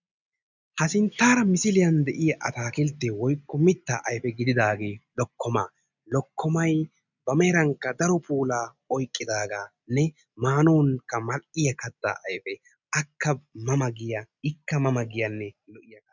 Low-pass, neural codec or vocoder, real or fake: 7.2 kHz; none; real